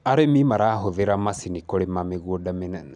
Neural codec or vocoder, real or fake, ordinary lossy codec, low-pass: none; real; none; 10.8 kHz